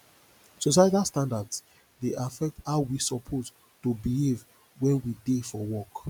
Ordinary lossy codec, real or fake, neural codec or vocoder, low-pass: none; real; none; none